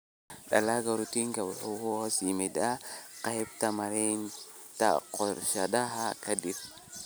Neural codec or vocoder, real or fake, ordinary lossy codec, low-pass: none; real; none; none